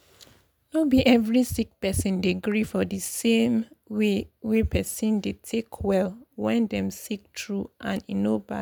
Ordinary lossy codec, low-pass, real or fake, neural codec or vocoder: none; none; real; none